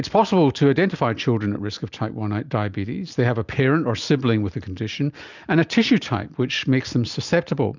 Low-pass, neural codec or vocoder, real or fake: 7.2 kHz; none; real